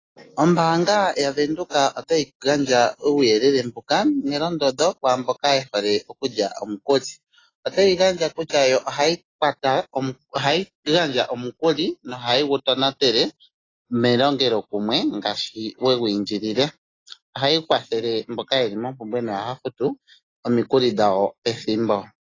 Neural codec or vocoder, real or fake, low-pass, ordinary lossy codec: none; real; 7.2 kHz; AAC, 32 kbps